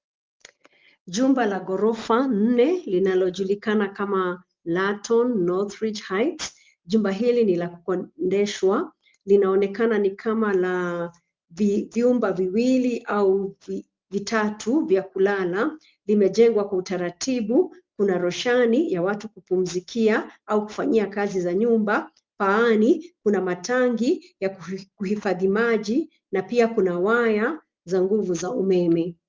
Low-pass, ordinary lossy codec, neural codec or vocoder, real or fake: 7.2 kHz; Opus, 32 kbps; none; real